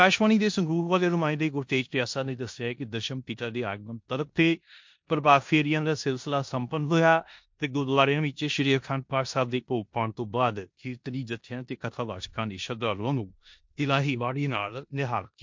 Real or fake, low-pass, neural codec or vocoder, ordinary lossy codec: fake; 7.2 kHz; codec, 16 kHz in and 24 kHz out, 0.9 kbps, LongCat-Audio-Codec, four codebook decoder; MP3, 48 kbps